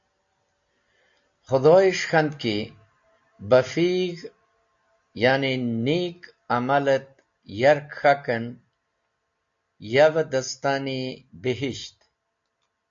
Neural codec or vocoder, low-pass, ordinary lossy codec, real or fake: none; 7.2 kHz; AAC, 64 kbps; real